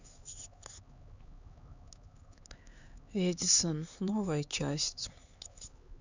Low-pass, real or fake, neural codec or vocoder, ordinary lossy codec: 7.2 kHz; fake; codec, 16 kHz, 4 kbps, X-Codec, HuBERT features, trained on LibriSpeech; Opus, 64 kbps